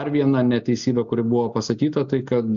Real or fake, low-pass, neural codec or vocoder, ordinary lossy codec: real; 7.2 kHz; none; MP3, 64 kbps